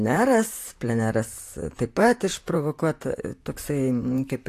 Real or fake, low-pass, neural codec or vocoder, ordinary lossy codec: fake; 14.4 kHz; vocoder, 44.1 kHz, 128 mel bands, Pupu-Vocoder; AAC, 64 kbps